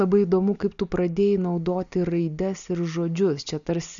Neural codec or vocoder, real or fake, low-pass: none; real; 7.2 kHz